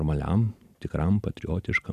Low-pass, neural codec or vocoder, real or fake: 14.4 kHz; none; real